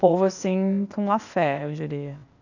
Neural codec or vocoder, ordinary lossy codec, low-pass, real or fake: codec, 16 kHz, 0.8 kbps, ZipCodec; none; 7.2 kHz; fake